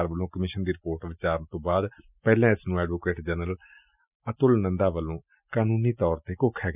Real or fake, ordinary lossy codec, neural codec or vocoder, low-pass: real; none; none; 3.6 kHz